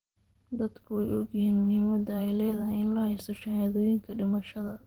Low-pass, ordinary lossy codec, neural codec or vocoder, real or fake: 19.8 kHz; Opus, 16 kbps; vocoder, 44.1 kHz, 128 mel bands every 512 samples, BigVGAN v2; fake